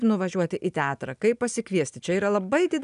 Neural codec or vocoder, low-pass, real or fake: none; 10.8 kHz; real